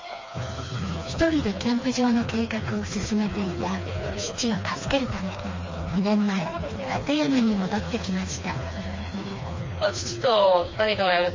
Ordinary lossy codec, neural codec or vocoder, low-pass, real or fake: MP3, 32 kbps; codec, 16 kHz, 2 kbps, FreqCodec, smaller model; 7.2 kHz; fake